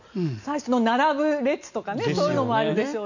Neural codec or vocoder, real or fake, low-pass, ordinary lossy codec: none; real; 7.2 kHz; none